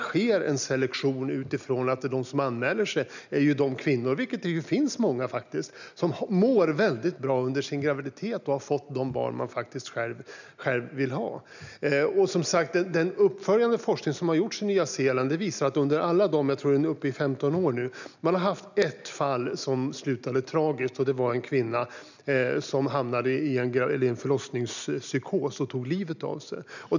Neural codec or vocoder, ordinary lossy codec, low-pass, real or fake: none; none; 7.2 kHz; real